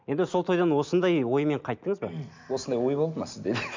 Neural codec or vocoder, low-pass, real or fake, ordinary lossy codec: none; 7.2 kHz; real; MP3, 64 kbps